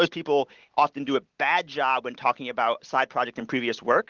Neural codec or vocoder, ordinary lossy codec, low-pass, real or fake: none; Opus, 16 kbps; 7.2 kHz; real